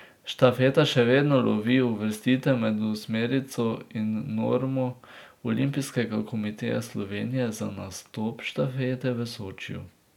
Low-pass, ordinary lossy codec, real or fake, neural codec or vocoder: 19.8 kHz; none; real; none